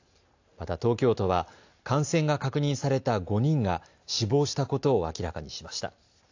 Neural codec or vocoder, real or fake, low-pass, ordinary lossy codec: none; real; 7.2 kHz; AAC, 48 kbps